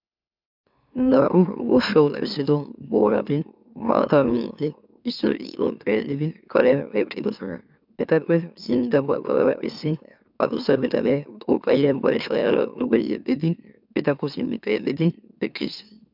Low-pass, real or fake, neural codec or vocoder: 5.4 kHz; fake; autoencoder, 44.1 kHz, a latent of 192 numbers a frame, MeloTTS